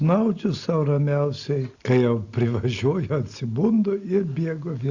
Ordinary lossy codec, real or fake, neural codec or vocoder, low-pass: Opus, 64 kbps; real; none; 7.2 kHz